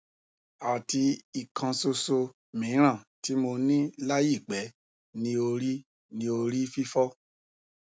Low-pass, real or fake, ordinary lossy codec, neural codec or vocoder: none; real; none; none